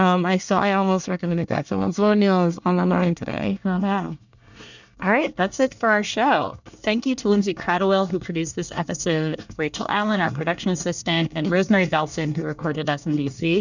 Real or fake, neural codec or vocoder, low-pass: fake; codec, 24 kHz, 1 kbps, SNAC; 7.2 kHz